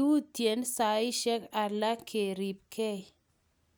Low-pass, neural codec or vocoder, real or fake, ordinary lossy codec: none; none; real; none